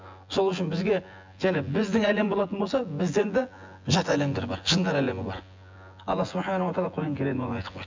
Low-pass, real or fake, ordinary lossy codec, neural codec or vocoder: 7.2 kHz; fake; MP3, 64 kbps; vocoder, 24 kHz, 100 mel bands, Vocos